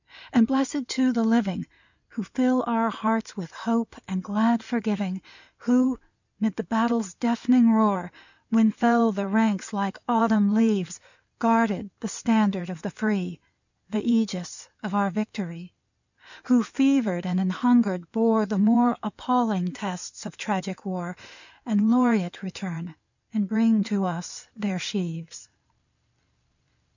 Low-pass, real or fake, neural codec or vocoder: 7.2 kHz; fake; codec, 16 kHz in and 24 kHz out, 2.2 kbps, FireRedTTS-2 codec